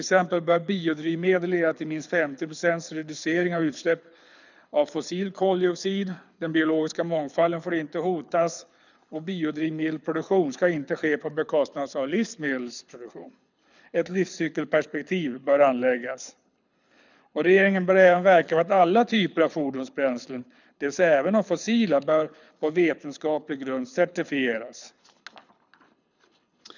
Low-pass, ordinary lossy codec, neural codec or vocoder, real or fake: 7.2 kHz; none; codec, 24 kHz, 6 kbps, HILCodec; fake